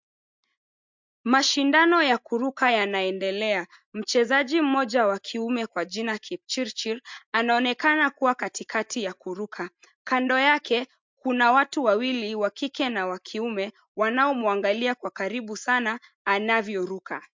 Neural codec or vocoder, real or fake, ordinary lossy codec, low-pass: none; real; MP3, 64 kbps; 7.2 kHz